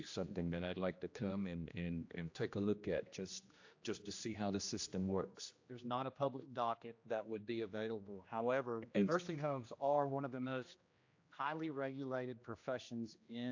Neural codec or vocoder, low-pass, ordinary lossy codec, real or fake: codec, 16 kHz, 1 kbps, X-Codec, HuBERT features, trained on general audio; 7.2 kHz; AAC, 48 kbps; fake